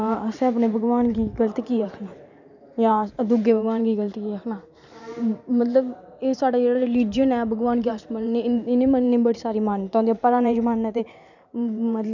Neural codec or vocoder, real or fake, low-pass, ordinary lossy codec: vocoder, 44.1 kHz, 128 mel bands every 512 samples, BigVGAN v2; fake; 7.2 kHz; none